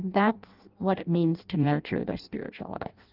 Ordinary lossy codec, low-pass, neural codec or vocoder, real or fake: Opus, 32 kbps; 5.4 kHz; codec, 16 kHz in and 24 kHz out, 0.6 kbps, FireRedTTS-2 codec; fake